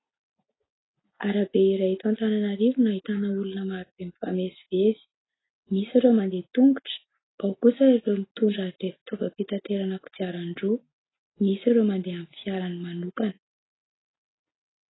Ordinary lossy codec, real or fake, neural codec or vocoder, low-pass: AAC, 16 kbps; real; none; 7.2 kHz